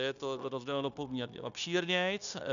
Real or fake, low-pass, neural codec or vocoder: fake; 7.2 kHz; codec, 16 kHz, 0.9 kbps, LongCat-Audio-Codec